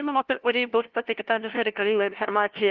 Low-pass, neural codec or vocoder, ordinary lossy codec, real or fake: 7.2 kHz; codec, 16 kHz, 0.5 kbps, FunCodec, trained on LibriTTS, 25 frames a second; Opus, 16 kbps; fake